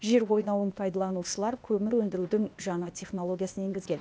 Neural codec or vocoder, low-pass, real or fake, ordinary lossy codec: codec, 16 kHz, 0.8 kbps, ZipCodec; none; fake; none